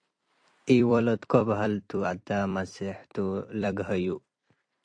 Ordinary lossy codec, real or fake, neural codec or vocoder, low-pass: AAC, 48 kbps; fake; vocoder, 44.1 kHz, 128 mel bands every 256 samples, BigVGAN v2; 9.9 kHz